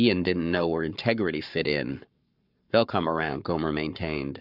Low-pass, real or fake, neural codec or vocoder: 5.4 kHz; fake; codec, 44.1 kHz, 7.8 kbps, Pupu-Codec